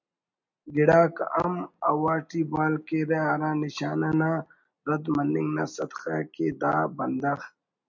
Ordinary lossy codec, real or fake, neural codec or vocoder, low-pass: MP3, 64 kbps; real; none; 7.2 kHz